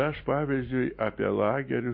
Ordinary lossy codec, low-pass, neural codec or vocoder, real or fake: MP3, 32 kbps; 5.4 kHz; none; real